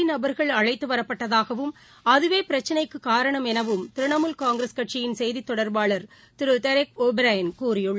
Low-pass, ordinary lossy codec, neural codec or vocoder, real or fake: none; none; none; real